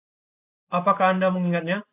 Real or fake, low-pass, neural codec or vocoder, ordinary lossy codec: real; 3.6 kHz; none; AAC, 32 kbps